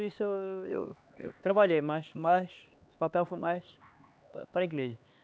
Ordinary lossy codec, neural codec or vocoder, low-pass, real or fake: none; codec, 16 kHz, 2 kbps, X-Codec, HuBERT features, trained on LibriSpeech; none; fake